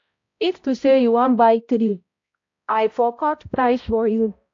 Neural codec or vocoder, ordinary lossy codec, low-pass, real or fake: codec, 16 kHz, 0.5 kbps, X-Codec, HuBERT features, trained on balanced general audio; AAC, 48 kbps; 7.2 kHz; fake